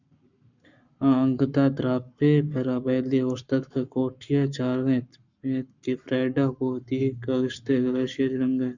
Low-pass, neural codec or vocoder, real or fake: 7.2 kHz; codec, 44.1 kHz, 7.8 kbps, Pupu-Codec; fake